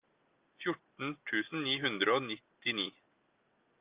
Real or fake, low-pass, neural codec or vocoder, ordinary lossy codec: real; 3.6 kHz; none; Opus, 24 kbps